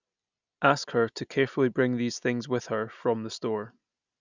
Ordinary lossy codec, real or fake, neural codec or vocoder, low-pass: none; real; none; 7.2 kHz